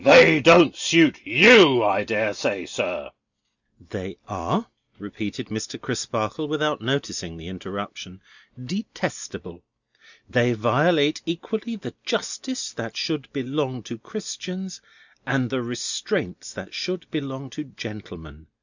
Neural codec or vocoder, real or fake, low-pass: none; real; 7.2 kHz